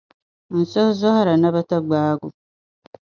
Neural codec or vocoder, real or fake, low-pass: none; real; 7.2 kHz